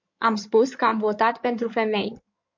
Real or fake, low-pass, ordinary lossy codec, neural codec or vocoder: fake; 7.2 kHz; MP3, 48 kbps; codec, 16 kHz in and 24 kHz out, 2.2 kbps, FireRedTTS-2 codec